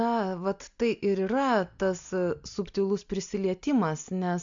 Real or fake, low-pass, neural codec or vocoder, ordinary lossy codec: real; 7.2 kHz; none; MP3, 64 kbps